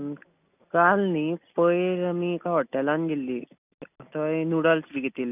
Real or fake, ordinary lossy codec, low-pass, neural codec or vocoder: real; none; 3.6 kHz; none